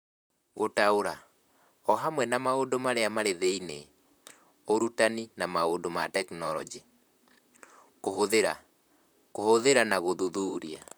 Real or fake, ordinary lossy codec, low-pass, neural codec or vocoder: fake; none; none; vocoder, 44.1 kHz, 128 mel bands, Pupu-Vocoder